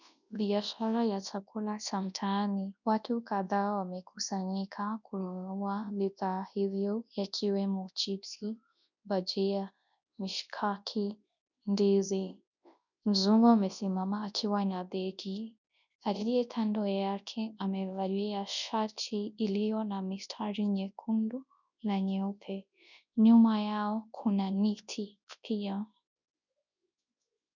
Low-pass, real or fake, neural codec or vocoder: 7.2 kHz; fake; codec, 24 kHz, 0.9 kbps, WavTokenizer, large speech release